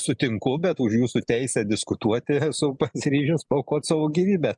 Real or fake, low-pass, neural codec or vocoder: real; 10.8 kHz; none